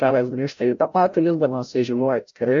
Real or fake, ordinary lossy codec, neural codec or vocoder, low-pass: fake; AAC, 64 kbps; codec, 16 kHz, 0.5 kbps, FreqCodec, larger model; 7.2 kHz